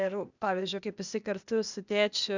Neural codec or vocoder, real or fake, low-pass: codec, 16 kHz, 0.8 kbps, ZipCodec; fake; 7.2 kHz